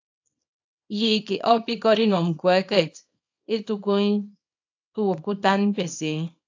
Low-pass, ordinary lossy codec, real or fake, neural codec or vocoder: 7.2 kHz; AAC, 48 kbps; fake; codec, 24 kHz, 0.9 kbps, WavTokenizer, small release